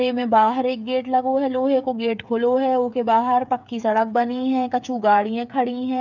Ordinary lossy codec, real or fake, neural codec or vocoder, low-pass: none; fake; codec, 16 kHz, 8 kbps, FreqCodec, smaller model; 7.2 kHz